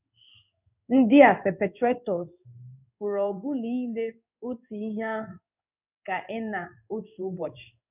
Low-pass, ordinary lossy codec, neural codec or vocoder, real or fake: 3.6 kHz; none; codec, 16 kHz in and 24 kHz out, 1 kbps, XY-Tokenizer; fake